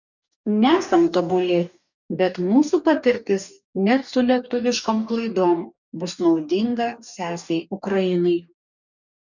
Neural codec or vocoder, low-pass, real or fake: codec, 44.1 kHz, 2.6 kbps, DAC; 7.2 kHz; fake